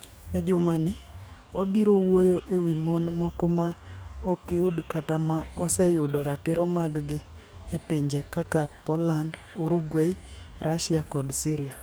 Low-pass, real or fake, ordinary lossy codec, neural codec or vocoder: none; fake; none; codec, 44.1 kHz, 2.6 kbps, DAC